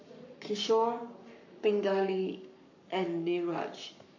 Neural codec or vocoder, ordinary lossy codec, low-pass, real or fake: codec, 44.1 kHz, 7.8 kbps, Pupu-Codec; AAC, 48 kbps; 7.2 kHz; fake